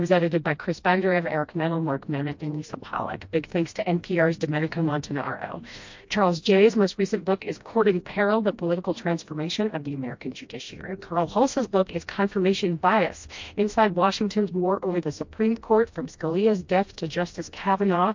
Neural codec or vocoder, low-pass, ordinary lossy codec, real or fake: codec, 16 kHz, 1 kbps, FreqCodec, smaller model; 7.2 kHz; MP3, 48 kbps; fake